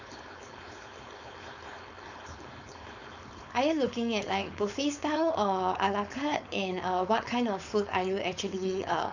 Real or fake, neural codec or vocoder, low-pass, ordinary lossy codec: fake; codec, 16 kHz, 4.8 kbps, FACodec; 7.2 kHz; none